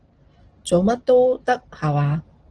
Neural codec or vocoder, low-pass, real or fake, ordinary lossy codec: none; 9.9 kHz; real; Opus, 16 kbps